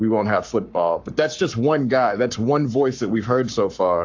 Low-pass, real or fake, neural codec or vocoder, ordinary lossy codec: 7.2 kHz; fake; codec, 44.1 kHz, 7.8 kbps, Pupu-Codec; AAC, 48 kbps